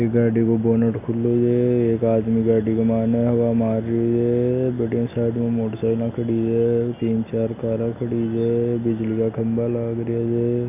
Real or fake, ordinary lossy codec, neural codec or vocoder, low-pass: real; none; none; 3.6 kHz